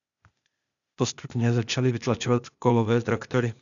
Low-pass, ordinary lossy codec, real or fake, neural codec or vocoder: 7.2 kHz; MP3, 96 kbps; fake; codec, 16 kHz, 0.8 kbps, ZipCodec